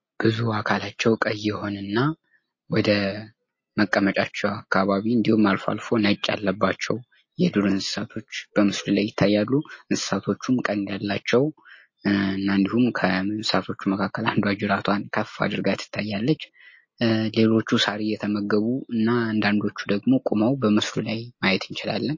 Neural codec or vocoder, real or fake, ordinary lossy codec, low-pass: none; real; MP3, 32 kbps; 7.2 kHz